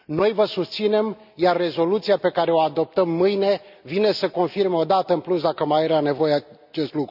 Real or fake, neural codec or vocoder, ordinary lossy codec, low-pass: real; none; none; 5.4 kHz